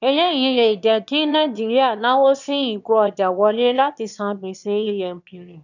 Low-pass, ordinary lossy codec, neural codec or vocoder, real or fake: 7.2 kHz; none; autoencoder, 22.05 kHz, a latent of 192 numbers a frame, VITS, trained on one speaker; fake